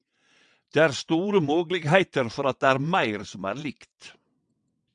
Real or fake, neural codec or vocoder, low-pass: fake; vocoder, 22.05 kHz, 80 mel bands, WaveNeXt; 9.9 kHz